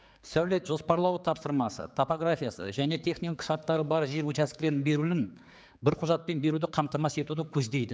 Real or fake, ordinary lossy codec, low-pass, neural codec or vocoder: fake; none; none; codec, 16 kHz, 4 kbps, X-Codec, HuBERT features, trained on general audio